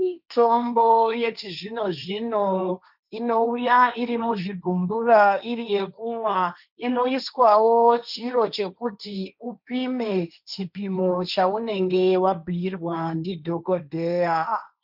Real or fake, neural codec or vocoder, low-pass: fake; codec, 16 kHz, 1.1 kbps, Voila-Tokenizer; 5.4 kHz